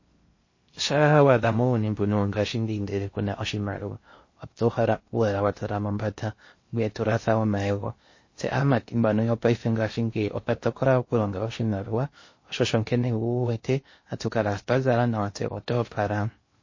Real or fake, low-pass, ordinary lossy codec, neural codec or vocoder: fake; 7.2 kHz; MP3, 32 kbps; codec, 16 kHz in and 24 kHz out, 0.6 kbps, FocalCodec, streaming, 4096 codes